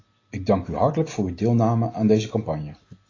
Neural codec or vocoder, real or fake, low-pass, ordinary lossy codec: none; real; 7.2 kHz; AAC, 32 kbps